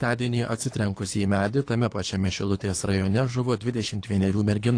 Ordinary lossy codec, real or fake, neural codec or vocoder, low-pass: AAC, 48 kbps; fake; codec, 24 kHz, 3 kbps, HILCodec; 9.9 kHz